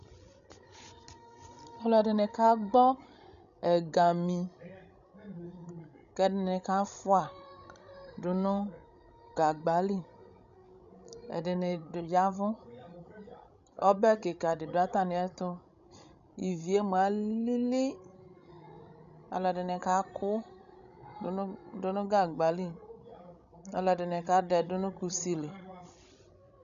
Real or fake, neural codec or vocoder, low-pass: fake; codec, 16 kHz, 16 kbps, FreqCodec, larger model; 7.2 kHz